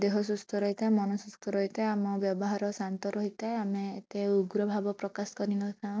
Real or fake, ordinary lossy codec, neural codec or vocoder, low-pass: real; none; none; none